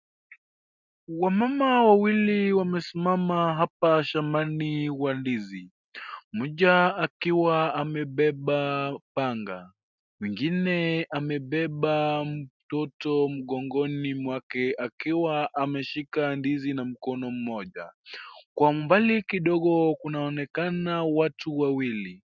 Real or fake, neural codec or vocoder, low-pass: real; none; 7.2 kHz